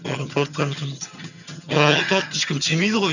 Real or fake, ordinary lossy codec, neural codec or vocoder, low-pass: fake; none; vocoder, 22.05 kHz, 80 mel bands, HiFi-GAN; 7.2 kHz